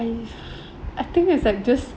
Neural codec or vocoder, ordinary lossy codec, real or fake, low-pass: none; none; real; none